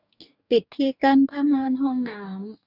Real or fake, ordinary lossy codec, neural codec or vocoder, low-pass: fake; none; codec, 44.1 kHz, 2.6 kbps, DAC; 5.4 kHz